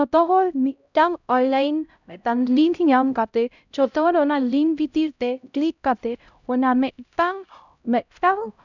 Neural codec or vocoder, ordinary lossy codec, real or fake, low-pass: codec, 16 kHz, 0.5 kbps, X-Codec, HuBERT features, trained on LibriSpeech; none; fake; 7.2 kHz